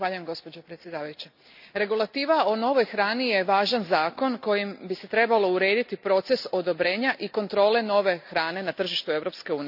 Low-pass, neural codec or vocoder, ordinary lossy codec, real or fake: 5.4 kHz; none; none; real